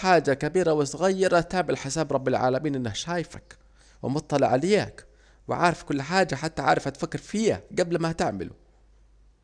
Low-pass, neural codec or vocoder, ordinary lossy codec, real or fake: 9.9 kHz; none; none; real